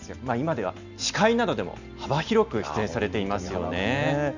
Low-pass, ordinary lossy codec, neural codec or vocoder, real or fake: 7.2 kHz; none; none; real